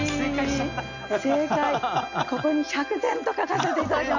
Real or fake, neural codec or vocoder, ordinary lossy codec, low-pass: real; none; none; 7.2 kHz